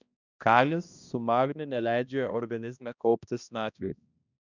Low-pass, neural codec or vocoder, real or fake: 7.2 kHz; codec, 16 kHz, 1 kbps, X-Codec, HuBERT features, trained on balanced general audio; fake